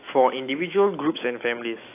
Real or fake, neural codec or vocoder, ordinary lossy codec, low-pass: fake; autoencoder, 48 kHz, 128 numbers a frame, DAC-VAE, trained on Japanese speech; AAC, 24 kbps; 3.6 kHz